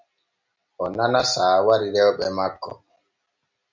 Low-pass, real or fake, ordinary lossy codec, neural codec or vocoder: 7.2 kHz; real; MP3, 48 kbps; none